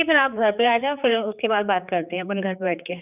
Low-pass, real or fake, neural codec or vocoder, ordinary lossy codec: 3.6 kHz; fake; codec, 16 kHz, 4 kbps, X-Codec, HuBERT features, trained on general audio; none